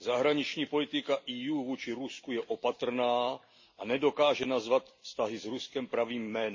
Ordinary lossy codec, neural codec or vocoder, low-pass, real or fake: MP3, 32 kbps; none; 7.2 kHz; real